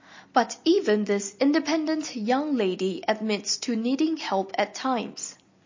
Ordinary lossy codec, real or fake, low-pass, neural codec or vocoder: MP3, 32 kbps; real; 7.2 kHz; none